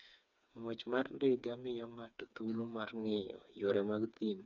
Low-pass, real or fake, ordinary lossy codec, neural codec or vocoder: 7.2 kHz; fake; none; codec, 16 kHz, 4 kbps, FreqCodec, smaller model